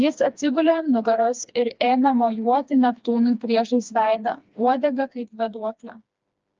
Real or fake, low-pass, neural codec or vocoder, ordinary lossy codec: fake; 7.2 kHz; codec, 16 kHz, 2 kbps, FreqCodec, smaller model; Opus, 24 kbps